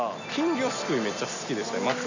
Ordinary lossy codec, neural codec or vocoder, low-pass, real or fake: none; none; 7.2 kHz; real